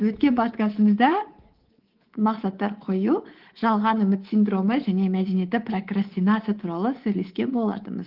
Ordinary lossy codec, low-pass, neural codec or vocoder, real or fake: Opus, 16 kbps; 5.4 kHz; codec, 24 kHz, 3.1 kbps, DualCodec; fake